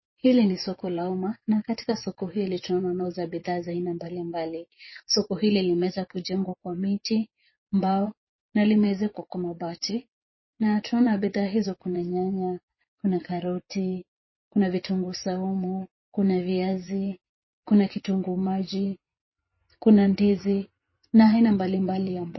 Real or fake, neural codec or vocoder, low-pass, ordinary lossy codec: fake; vocoder, 24 kHz, 100 mel bands, Vocos; 7.2 kHz; MP3, 24 kbps